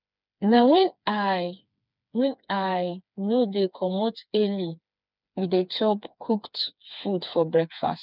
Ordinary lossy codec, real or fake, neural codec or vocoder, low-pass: none; fake; codec, 16 kHz, 4 kbps, FreqCodec, smaller model; 5.4 kHz